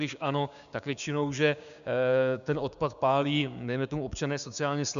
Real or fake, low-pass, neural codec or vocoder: fake; 7.2 kHz; codec, 16 kHz, 6 kbps, DAC